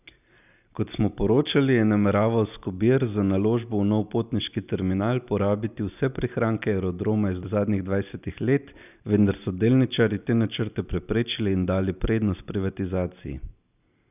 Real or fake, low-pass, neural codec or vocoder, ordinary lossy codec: real; 3.6 kHz; none; none